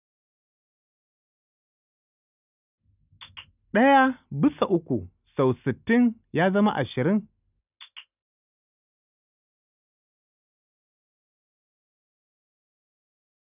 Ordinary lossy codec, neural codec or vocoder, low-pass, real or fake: none; none; 3.6 kHz; real